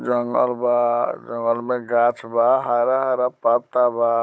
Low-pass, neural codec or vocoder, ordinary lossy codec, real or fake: none; codec, 16 kHz, 16 kbps, FunCodec, trained on Chinese and English, 50 frames a second; none; fake